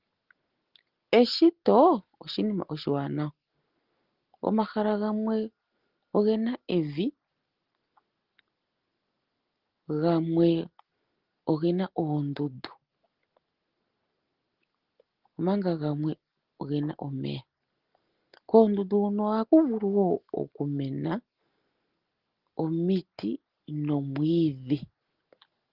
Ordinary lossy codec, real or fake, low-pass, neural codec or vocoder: Opus, 16 kbps; real; 5.4 kHz; none